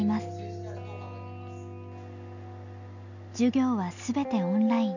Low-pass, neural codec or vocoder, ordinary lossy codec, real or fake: 7.2 kHz; none; none; real